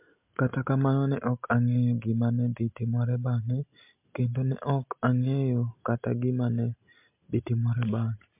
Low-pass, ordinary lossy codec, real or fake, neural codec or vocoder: 3.6 kHz; MP3, 32 kbps; real; none